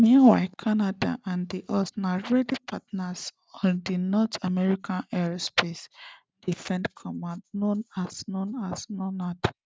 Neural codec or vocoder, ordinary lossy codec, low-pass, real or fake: none; none; none; real